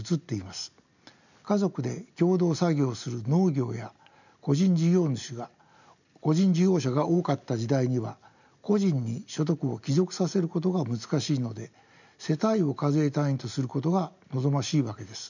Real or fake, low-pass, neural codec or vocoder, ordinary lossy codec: real; 7.2 kHz; none; none